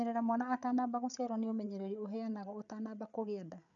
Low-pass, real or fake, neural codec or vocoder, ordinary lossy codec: 7.2 kHz; fake; codec, 16 kHz, 8 kbps, FreqCodec, larger model; none